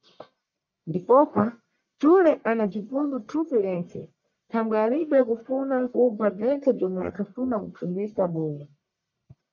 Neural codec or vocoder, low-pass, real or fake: codec, 44.1 kHz, 1.7 kbps, Pupu-Codec; 7.2 kHz; fake